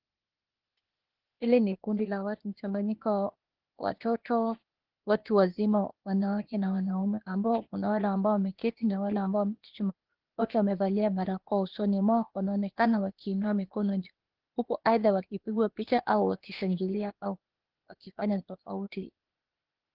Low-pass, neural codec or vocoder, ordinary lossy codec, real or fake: 5.4 kHz; codec, 16 kHz, 0.8 kbps, ZipCodec; Opus, 16 kbps; fake